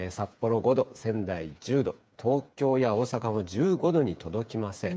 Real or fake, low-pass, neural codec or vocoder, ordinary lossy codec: fake; none; codec, 16 kHz, 8 kbps, FreqCodec, smaller model; none